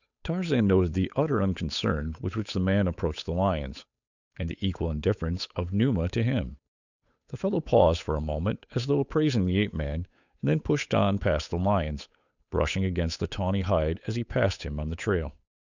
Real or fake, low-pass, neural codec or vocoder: fake; 7.2 kHz; codec, 16 kHz, 8 kbps, FunCodec, trained on Chinese and English, 25 frames a second